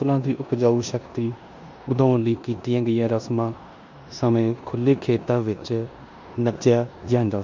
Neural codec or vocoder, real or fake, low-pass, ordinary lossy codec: codec, 16 kHz in and 24 kHz out, 0.9 kbps, LongCat-Audio-Codec, four codebook decoder; fake; 7.2 kHz; MP3, 64 kbps